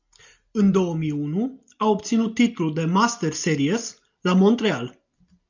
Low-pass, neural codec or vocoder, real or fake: 7.2 kHz; none; real